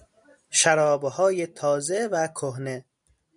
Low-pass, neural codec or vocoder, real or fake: 10.8 kHz; none; real